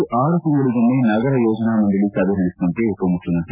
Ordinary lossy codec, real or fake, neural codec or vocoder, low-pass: none; real; none; 3.6 kHz